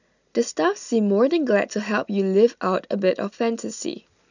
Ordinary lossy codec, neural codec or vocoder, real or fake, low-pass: none; none; real; 7.2 kHz